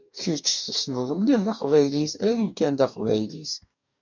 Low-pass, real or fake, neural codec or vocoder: 7.2 kHz; fake; codec, 44.1 kHz, 2.6 kbps, DAC